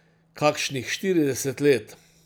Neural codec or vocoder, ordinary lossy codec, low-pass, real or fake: none; none; none; real